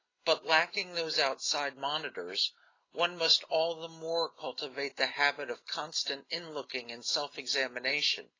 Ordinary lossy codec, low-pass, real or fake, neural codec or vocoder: AAC, 32 kbps; 7.2 kHz; real; none